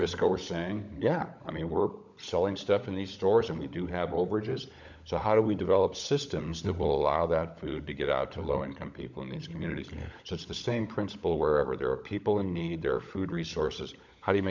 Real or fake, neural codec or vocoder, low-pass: fake; codec, 16 kHz, 16 kbps, FunCodec, trained on LibriTTS, 50 frames a second; 7.2 kHz